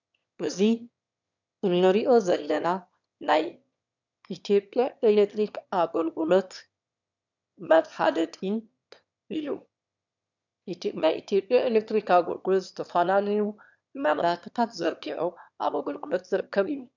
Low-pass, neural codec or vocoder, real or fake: 7.2 kHz; autoencoder, 22.05 kHz, a latent of 192 numbers a frame, VITS, trained on one speaker; fake